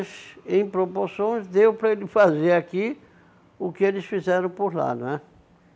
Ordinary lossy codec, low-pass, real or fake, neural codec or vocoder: none; none; real; none